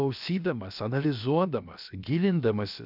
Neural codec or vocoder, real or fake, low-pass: codec, 16 kHz, about 1 kbps, DyCAST, with the encoder's durations; fake; 5.4 kHz